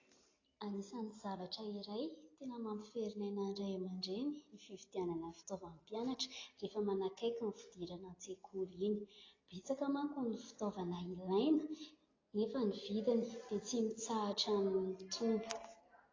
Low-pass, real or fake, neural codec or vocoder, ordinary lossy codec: 7.2 kHz; real; none; AAC, 32 kbps